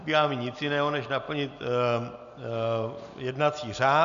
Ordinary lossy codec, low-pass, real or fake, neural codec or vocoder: AAC, 96 kbps; 7.2 kHz; real; none